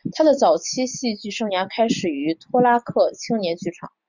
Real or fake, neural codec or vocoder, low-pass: real; none; 7.2 kHz